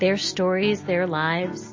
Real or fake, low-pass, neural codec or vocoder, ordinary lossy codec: real; 7.2 kHz; none; MP3, 32 kbps